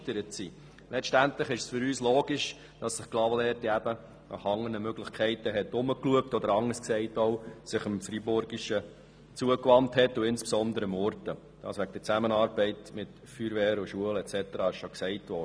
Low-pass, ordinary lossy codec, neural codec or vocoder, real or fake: none; none; none; real